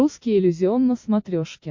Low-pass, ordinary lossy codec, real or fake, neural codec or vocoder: 7.2 kHz; MP3, 48 kbps; real; none